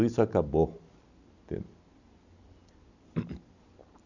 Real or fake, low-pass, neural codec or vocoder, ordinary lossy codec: real; 7.2 kHz; none; Opus, 64 kbps